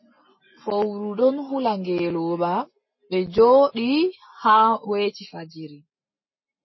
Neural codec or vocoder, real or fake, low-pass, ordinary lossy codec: none; real; 7.2 kHz; MP3, 24 kbps